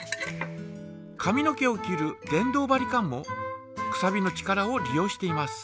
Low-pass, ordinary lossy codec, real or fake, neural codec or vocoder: none; none; real; none